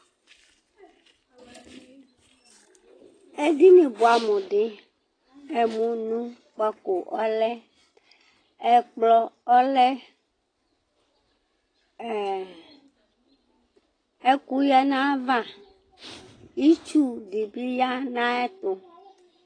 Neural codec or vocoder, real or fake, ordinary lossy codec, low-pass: none; real; AAC, 32 kbps; 9.9 kHz